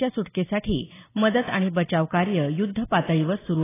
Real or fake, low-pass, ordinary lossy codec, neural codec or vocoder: real; 3.6 kHz; AAC, 16 kbps; none